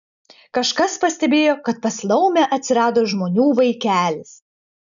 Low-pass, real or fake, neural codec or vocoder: 7.2 kHz; real; none